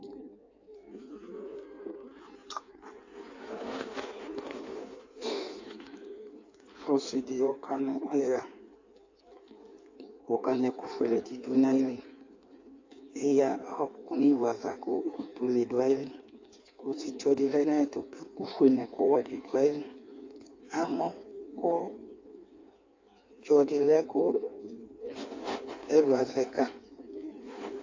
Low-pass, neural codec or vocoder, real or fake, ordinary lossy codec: 7.2 kHz; codec, 16 kHz in and 24 kHz out, 1.1 kbps, FireRedTTS-2 codec; fake; AAC, 48 kbps